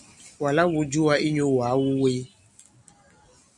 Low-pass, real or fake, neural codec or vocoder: 10.8 kHz; real; none